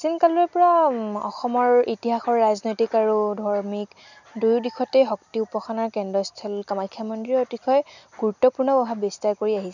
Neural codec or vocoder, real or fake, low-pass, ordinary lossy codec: none; real; 7.2 kHz; none